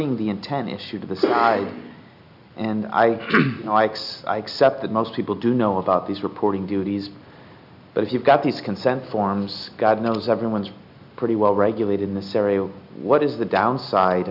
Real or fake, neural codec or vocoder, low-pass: real; none; 5.4 kHz